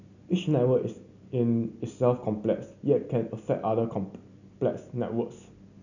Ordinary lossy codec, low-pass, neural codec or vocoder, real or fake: none; 7.2 kHz; none; real